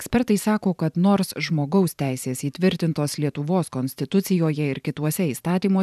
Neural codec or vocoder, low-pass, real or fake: vocoder, 44.1 kHz, 128 mel bands every 512 samples, BigVGAN v2; 14.4 kHz; fake